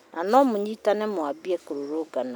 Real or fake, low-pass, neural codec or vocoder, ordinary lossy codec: real; none; none; none